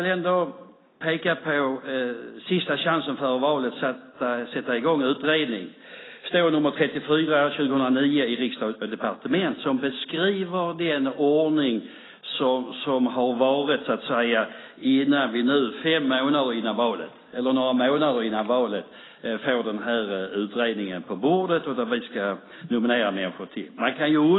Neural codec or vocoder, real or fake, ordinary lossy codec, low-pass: none; real; AAC, 16 kbps; 7.2 kHz